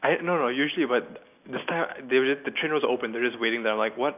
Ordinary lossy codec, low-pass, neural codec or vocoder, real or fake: none; 3.6 kHz; none; real